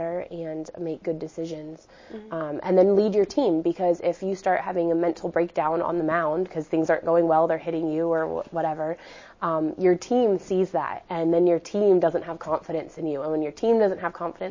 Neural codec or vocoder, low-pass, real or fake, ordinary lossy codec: none; 7.2 kHz; real; MP3, 32 kbps